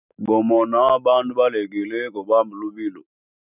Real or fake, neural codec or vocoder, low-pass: real; none; 3.6 kHz